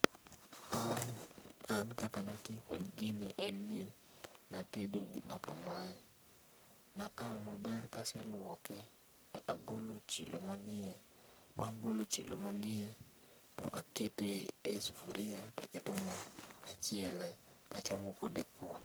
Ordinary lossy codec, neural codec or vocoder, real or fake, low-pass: none; codec, 44.1 kHz, 1.7 kbps, Pupu-Codec; fake; none